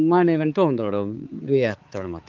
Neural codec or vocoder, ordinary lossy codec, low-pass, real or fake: codec, 16 kHz, 4 kbps, X-Codec, HuBERT features, trained on balanced general audio; Opus, 32 kbps; 7.2 kHz; fake